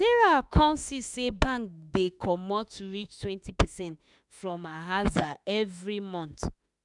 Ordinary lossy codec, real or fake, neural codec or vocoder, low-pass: none; fake; autoencoder, 48 kHz, 32 numbers a frame, DAC-VAE, trained on Japanese speech; 10.8 kHz